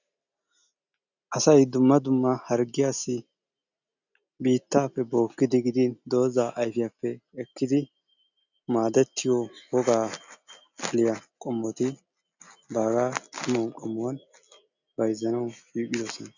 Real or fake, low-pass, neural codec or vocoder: real; 7.2 kHz; none